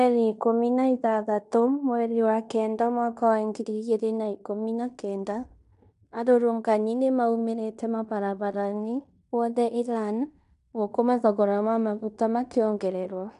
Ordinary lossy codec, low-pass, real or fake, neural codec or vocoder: MP3, 96 kbps; 10.8 kHz; fake; codec, 16 kHz in and 24 kHz out, 0.9 kbps, LongCat-Audio-Codec, fine tuned four codebook decoder